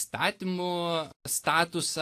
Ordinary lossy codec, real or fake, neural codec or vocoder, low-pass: AAC, 64 kbps; real; none; 14.4 kHz